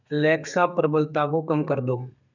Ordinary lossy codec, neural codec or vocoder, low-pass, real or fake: none; codec, 32 kHz, 1.9 kbps, SNAC; 7.2 kHz; fake